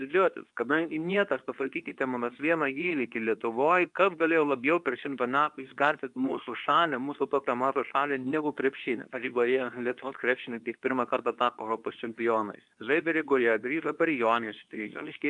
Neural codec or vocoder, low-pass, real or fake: codec, 24 kHz, 0.9 kbps, WavTokenizer, medium speech release version 2; 10.8 kHz; fake